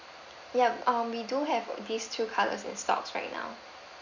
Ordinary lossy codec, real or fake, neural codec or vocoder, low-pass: none; real; none; 7.2 kHz